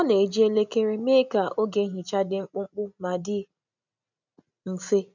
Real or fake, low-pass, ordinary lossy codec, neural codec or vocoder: real; 7.2 kHz; none; none